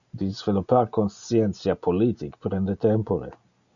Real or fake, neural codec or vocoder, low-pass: real; none; 7.2 kHz